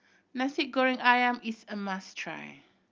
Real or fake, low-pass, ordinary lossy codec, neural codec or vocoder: real; 7.2 kHz; Opus, 32 kbps; none